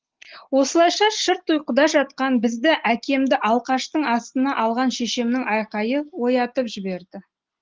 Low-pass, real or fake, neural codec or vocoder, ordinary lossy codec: 7.2 kHz; real; none; Opus, 16 kbps